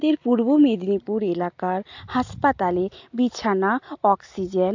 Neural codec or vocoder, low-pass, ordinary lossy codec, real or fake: none; 7.2 kHz; AAC, 48 kbps; real